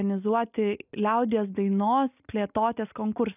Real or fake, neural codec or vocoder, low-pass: real; none; 3.6 kHz